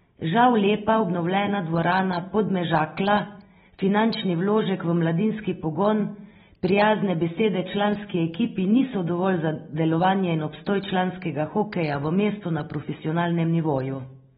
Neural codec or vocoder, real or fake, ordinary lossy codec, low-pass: none; real; AAC, 16 kbps; 7.2 kHz